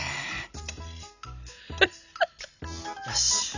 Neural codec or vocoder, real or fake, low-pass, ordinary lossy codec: none; real; 7.2 kHz; none